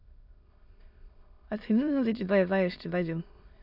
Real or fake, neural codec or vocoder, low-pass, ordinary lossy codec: fake; autoencoder, 22.05 kHz, a latent of 192 numbers a frame, VITS, trained on many speakers; 5.4 kHz; MP3, 48 kbps